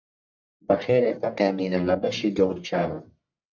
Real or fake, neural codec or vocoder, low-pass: fake; codec, 44.1 kHz, 1.7 kbps, Pupu-Codec; 7.2 kHz